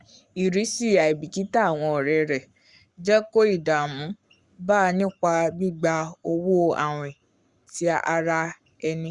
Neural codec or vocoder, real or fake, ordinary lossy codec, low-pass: codec, 44.1 kHz, 7.8 kbps, Pupu-Codec; fake; Opus, 64 kbps; 10.8 kHz